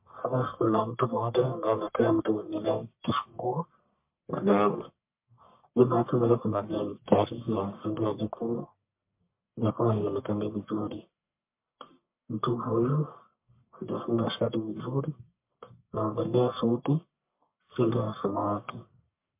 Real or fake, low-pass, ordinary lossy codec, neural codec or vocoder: fake; 3.6 kHz; MP3, 32 kbps; codec, 44.1 kHz, 1.7 kbps, Pupu-Codec